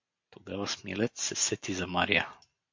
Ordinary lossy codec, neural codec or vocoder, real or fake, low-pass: MP3, 48 kbps; none; real; 7.2 kHz